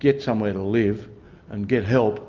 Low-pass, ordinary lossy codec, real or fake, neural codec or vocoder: 7.2 kHz; Opus, 24 kbps; real; none